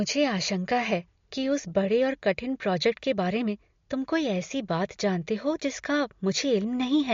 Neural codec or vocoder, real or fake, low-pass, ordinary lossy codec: none; real; 7.2 kHz; MP3, 48 kbps